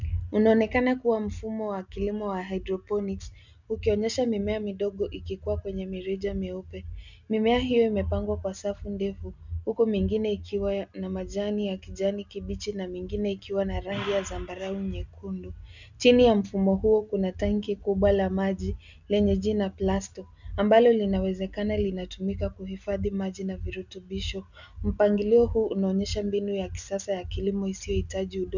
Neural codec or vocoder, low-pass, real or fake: none; 7.2 kHz; real